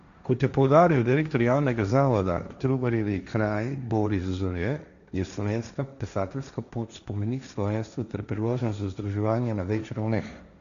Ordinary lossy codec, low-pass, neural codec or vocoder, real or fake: MP3, 96 kbps; 7.2 kHz; codec, 16 kHz, 1.1 kbps, Voila-Tokenizer; fake